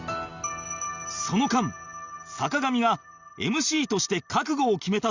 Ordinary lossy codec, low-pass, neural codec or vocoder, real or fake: Opus, 64 kbps; 7.2 kHz; none; real